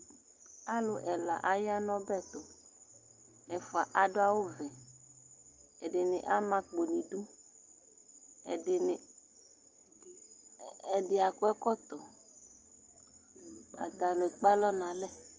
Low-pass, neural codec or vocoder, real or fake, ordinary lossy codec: 7.2 kHz; none; real; Opus, 24 kbps